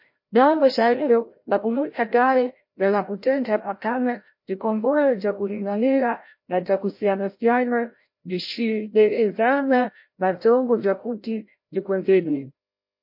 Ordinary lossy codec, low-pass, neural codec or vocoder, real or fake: MP3, 32 kbps; 5.4 kHz; codec, 16 kHz, 0.5 kbps, FreqCodec, larger model; fake